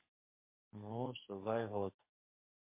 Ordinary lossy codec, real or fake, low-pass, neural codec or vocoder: MP3, 24 kbps; fake; 3.6 kHz; codec, 44.1 kHz, 2.6 kbps, SNAC